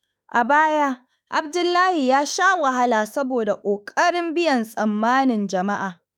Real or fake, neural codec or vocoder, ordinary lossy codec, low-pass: fake; autoencoder, 48 kHz, 32 numbers a frame, DAC-VAE, trained on Japanese speech; none; none